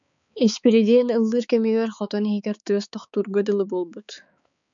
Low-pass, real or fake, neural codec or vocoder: 7.2 kHz; fake; codec, 16 kHz, 4 kbps, X-Codec, HuBERT features, trained on balanced general audio